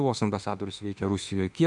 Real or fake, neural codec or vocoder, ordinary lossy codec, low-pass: fake; autoencoder, 48 kHz, 32 numbers a frame, DAC-VAE, trained on Japanese speech; MP3, 96 kbps; 10.8 kHz